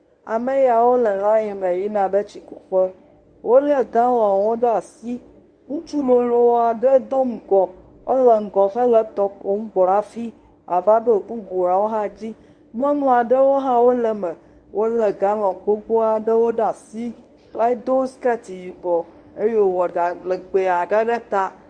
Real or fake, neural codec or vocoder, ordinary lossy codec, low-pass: fake; codec, 24 kHz, 0.9 kbps, WavTokenizer, medium speech release version 1; Opus, 64 kbps; 9.9 kHz